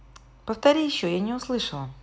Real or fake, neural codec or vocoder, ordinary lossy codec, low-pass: real; none; none; none